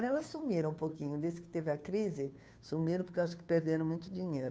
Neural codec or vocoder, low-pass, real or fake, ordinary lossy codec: codec, 16 kHz, 2 kbps, FunCodec, trained on Chinese and English, 25 frames a second; none; fake; none